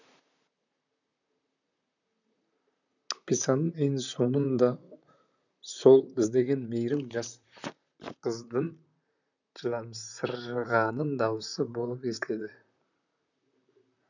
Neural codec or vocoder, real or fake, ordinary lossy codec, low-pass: vocoder, 44.1 kHz, 128 mel bands, Pupu-Vocoder; fake; none; 7.2 kHz